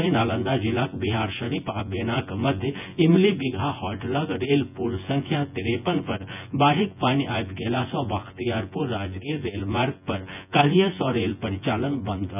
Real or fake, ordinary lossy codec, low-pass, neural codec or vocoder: fake; none; 3.6 kHz; vocoder, 24 kHz, 100 mel bands, Vocos